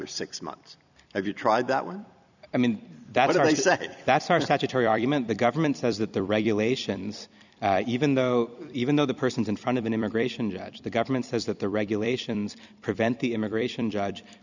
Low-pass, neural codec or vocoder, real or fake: 7.2 kHz; vocoder, 44.1 kHz, 128 mel bands every 256 samples, BigVGAN v2; fake